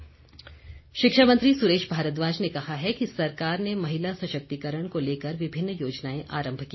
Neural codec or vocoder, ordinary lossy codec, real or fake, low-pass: none; MP3, 24 kbps; real; 7.2 kHz